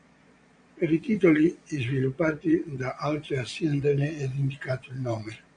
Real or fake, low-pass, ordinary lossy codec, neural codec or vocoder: fake; 9.9 kHz; MP3, 64 kbps; vocoder, 22.05 kHz, 80 mel bands, Vocos